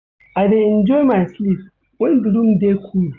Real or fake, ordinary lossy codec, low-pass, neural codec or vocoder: real; none; 7.2 kHz; none